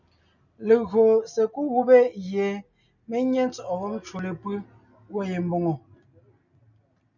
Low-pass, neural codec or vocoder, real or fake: 7.2 kHz; none; real